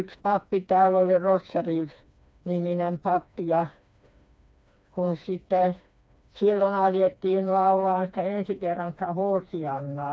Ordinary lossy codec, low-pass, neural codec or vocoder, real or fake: none; none; codec, 16 kHz, 2 kbps, FreqCodec, smaller model; fake